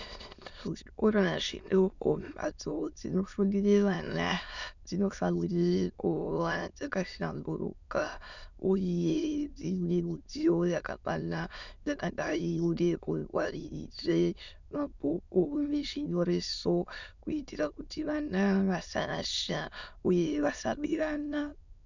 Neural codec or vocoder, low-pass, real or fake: autoencoder, 22.05 kHz, a latent of 192 numbers a frame, VITS, trained on many speakers; 7.2 kHz; fake